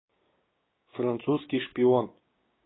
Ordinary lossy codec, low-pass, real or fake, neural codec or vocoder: AAC, 16 kbps; 7.2 kHz; fake; codec, 16 kHz, 6 kbps, DAC